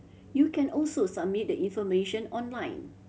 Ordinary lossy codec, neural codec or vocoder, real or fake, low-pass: none; none; real; none